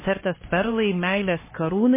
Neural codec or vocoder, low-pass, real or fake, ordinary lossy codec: codec, 16 kHz, 2 kbps, X-Codec, HuBERT features, trained on LibriSpeech; 3.6 kHz; fake; MP3, 16 kbps